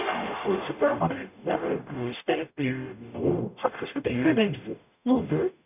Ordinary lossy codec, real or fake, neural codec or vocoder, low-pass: none; fake; codec, 44.1 kHz, 0.9 kbps, DAC; 3.6 kHz